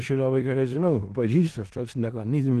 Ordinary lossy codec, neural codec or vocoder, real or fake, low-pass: Opus, 16 kbps; codec, 16 kHz in and 24 kHz out, 0.4 kbps, LongCat-Audio-Codec, four codebook decoder; fake; 10.8 kHz